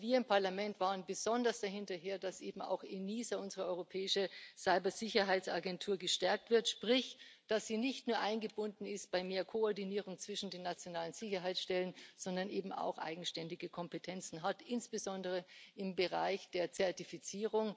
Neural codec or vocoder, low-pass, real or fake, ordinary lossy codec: none; none; real; none